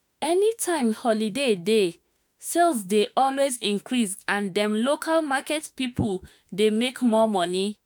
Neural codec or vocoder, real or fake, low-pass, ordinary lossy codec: autoencoder, 48 kHz, 32 numbers a frame, DAC-VAE, trained on Japanese speech; fake; none; none